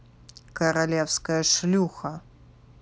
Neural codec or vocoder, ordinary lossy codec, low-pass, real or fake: none; none; none; real